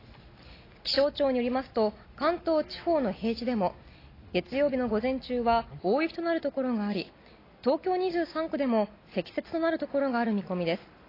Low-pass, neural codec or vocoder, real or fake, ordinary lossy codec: 5.4 kHz; none; real; AAC, 24 kbps